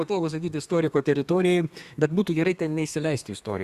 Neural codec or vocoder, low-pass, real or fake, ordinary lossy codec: codec, 32 kHz, 1.9 kbps, SNAC; 14.4 kHz; fake; Opus, 64 kbps